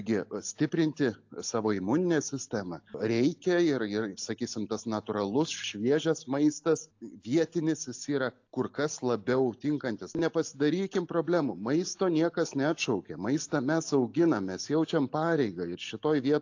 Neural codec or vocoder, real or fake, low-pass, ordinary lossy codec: none; real; 7.2 kHz; AAC, 48 kbps